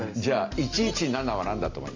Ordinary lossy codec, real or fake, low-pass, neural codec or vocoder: MP3, 64 kbps; real; 7.2 kHz; none